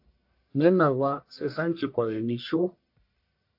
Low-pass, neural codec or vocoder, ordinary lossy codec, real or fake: 5.4 kHz; codec, 44.1 kHz, 1.7 kbps, Pupu-Codec; AAC, 48 kbps; fake